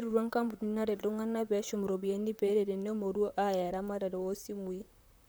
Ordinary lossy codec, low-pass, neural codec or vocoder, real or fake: none; none; vocoder, 44.1 kHz, 128 mel bands, Pupu-Vocoder; fake